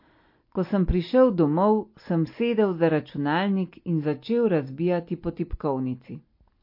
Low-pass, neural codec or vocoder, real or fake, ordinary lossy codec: 5.4 kHz; none; real; MP3, 32 kbps